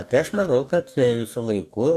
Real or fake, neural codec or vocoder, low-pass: fake; codec, 44.1 kHz, 2.6 kbps, DAC; 14.4 kHz